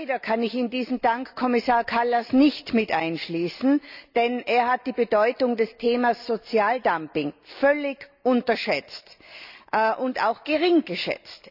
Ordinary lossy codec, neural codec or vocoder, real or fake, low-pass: none; none; real; 5.4 kHz